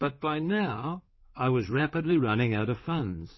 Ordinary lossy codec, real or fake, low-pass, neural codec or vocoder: MP3, 24 kbps; fake; 7.2 kHz; codec, 16 kHz in and 24 kHz out, 2.2 kbps, FireRedTTS-2 codec